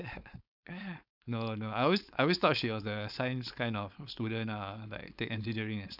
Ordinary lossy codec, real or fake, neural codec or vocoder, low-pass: none; fake; codec, 16 kHz, 4.8 kbps, FACodec; 5.4 kHz